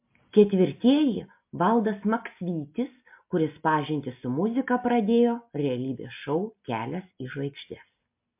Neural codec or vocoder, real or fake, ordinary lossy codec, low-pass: none; real; MP3, 32 kbps; 3.6 kHz